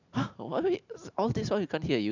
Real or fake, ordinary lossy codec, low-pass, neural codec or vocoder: real; none; 7.2 kHz; none